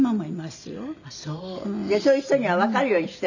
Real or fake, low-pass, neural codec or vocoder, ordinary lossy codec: real; 7.2 kHz; none; none